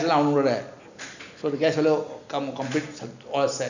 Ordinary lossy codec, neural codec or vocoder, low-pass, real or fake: none; none; 7.2 kHz; real